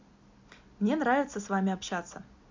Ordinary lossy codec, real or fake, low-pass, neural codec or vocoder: MP3, 64 kbps; real; 7.2 kHz; none